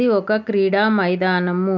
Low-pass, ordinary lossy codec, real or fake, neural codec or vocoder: 7.2 kHz; none; real; none